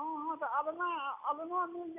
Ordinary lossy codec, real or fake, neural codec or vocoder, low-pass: none; real; none; 3.6 kHz